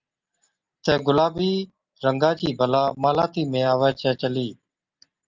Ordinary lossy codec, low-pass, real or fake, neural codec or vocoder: Opus, 24 kbps; 7.2 kHz; real; none